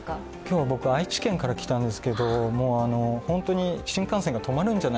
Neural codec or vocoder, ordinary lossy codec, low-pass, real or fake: none; none; none; real